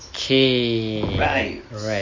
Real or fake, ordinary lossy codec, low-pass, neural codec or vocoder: real; MP3, 32 kbps; 7.2 kHz; none